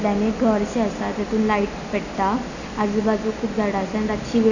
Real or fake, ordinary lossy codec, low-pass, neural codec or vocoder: real; none; 7.2 kHz; none